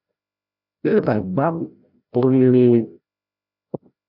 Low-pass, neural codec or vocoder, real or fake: 5.4 kHz; codec, 16 kHz, 0.5 kbps, FreqCodec, larger model; fake